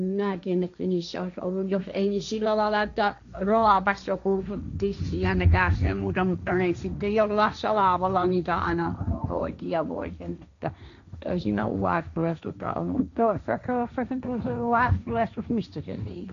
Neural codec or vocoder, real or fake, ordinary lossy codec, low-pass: codec, 16 kHz, 1.1 kbps, Voila-Tokenizer; fake; none; 7.2 kHz